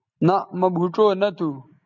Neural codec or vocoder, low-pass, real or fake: none; 7.2 kHz; real